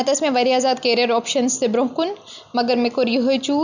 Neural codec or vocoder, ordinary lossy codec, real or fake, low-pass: none; none; real; 7.2 kHz